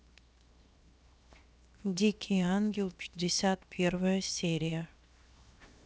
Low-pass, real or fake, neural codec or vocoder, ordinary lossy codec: none; fake; codec, 16 kHz, 0.7 kbps, FocalCodec; none